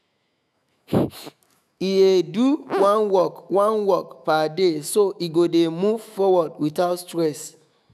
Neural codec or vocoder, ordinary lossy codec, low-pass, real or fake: autoencoder, 48 kHz, 128 numbers a frame, DAC-VAE, trained on Japanese speech; none; 14.4 kHz; fake